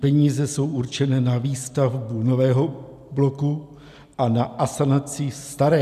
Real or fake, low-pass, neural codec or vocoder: real; 14.4 kHz; none